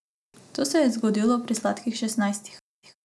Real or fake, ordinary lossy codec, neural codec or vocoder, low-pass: real; none; none; none